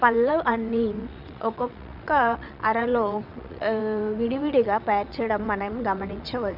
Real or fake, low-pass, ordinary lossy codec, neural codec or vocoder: fake; 5.4 kHz; none; vocoder, 22.05 kHz, 80 mel bands, WaveNeXt